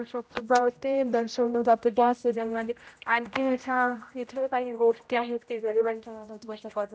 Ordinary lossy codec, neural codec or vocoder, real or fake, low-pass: none; codec, 16 kHz, 0.5 kbps, X-Codec, HuBERT features, trained on general audio; fake; none